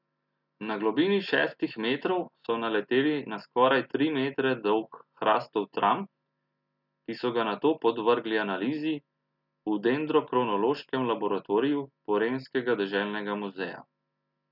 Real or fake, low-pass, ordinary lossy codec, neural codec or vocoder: real; 5.4 kHz; none; none